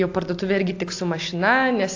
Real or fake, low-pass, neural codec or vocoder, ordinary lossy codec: real; 7.2 kHz; none; AAC, 48 kbps